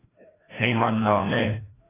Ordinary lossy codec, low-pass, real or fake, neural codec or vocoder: AAC, 16 kbps; 3.6 kHz; fake; codec, 16 kHz, 1 kbps, FreqCodec, larger model